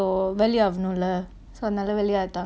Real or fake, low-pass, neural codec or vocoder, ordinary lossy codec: real; none; none; none